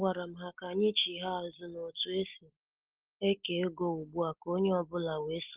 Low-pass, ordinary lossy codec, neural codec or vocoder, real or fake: 3.6 kHz; Opus, 16 kbps; none; real